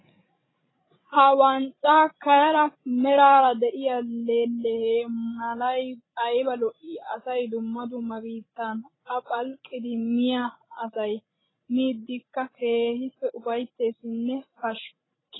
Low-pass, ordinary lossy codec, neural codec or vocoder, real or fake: 7.2 kHz; AAC, 16 kbps; codec, 16 kHz, 16 kbps, FreqCodec, larger model; fake